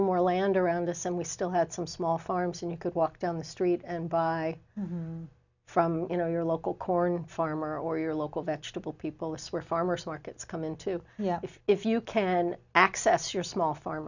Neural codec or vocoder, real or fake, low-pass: none; real; 7.2 kHz